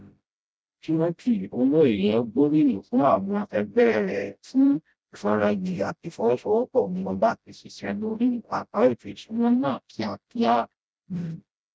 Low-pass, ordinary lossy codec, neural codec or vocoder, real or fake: none; none; codec, 16 kHz, 0.5 kbps, FreqCodec, smaller model; fake